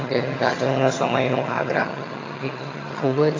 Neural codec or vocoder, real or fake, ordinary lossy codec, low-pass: vocoder, 22.05 kHz, 80 mel bands, HiFi-GAN; fake; AAC, 32 kbps; 7.2 kHz